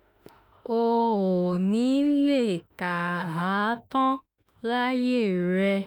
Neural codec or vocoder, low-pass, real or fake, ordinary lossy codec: autoencoder, 48 kHz, 32 numbers a frame, DAC-VAE, trained on Japanese speech; 19.8 kHz; fake; none